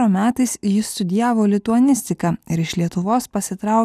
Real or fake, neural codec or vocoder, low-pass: real; none; 14.4 kHz